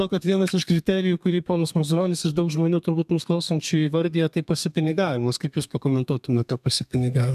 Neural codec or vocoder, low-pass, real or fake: codec, 32 kHz, 1.9 kbps, SNAC; 14.4 kHz; fake